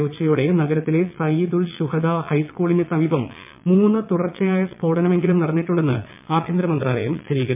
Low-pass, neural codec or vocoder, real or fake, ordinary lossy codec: 3.6 kHz; vocoder, 22.05 kHz, 80 mel bands, Vocos; fake; MP3, 32 kbps